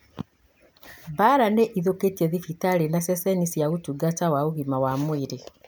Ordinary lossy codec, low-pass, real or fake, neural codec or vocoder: none; none; real; none